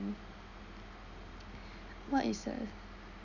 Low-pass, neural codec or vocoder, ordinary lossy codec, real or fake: 7.2 kHz; none; none; real